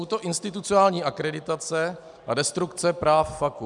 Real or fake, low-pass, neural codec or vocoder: real; 10.8 kHz; none